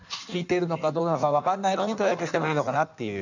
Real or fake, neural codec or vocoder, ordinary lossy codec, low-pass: fake; codec, 16 kHz in and 24 kHz out, 1.1 kbps, FireRedTTS-2 codec; none; 7.2 kHz